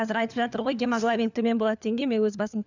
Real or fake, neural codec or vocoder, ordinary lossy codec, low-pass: fake; codec, 16 kHz, 4 kbps, FunCodec, trained on LibriTTS, 50 frames a second; none; 7.2 kHz